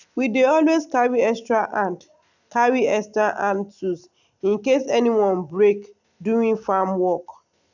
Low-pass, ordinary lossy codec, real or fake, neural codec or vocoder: 7.2 kHz; none; real; none